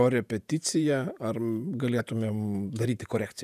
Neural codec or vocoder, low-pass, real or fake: vocoder, 48 kHz, 128 mel bands, Vocos; 14.4 kHz; fake